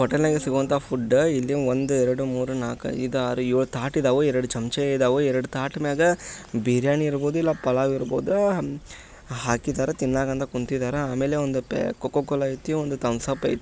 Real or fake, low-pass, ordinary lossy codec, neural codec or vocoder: real; none; none; none